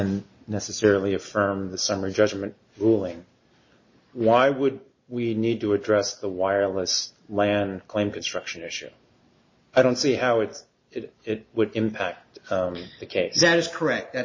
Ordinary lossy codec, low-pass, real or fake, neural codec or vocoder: MP3, 32 kbps; 7.2 kHz; real; none